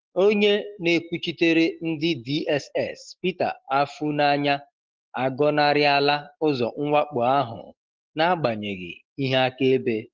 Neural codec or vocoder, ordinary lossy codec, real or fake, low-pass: none; Opus, 16 kbps; real; 7.2 kHz